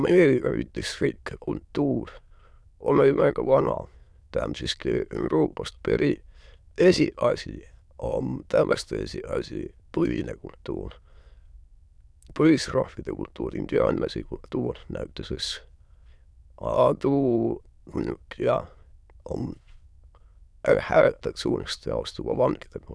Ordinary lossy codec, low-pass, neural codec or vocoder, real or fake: none; none; autoencoder, 22.05 kHz, a latent of 192 numbers a frame, VITS, trained on many speakers; fake